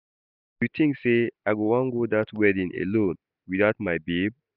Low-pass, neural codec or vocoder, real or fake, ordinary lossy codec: 5.4 kHz; none; real; none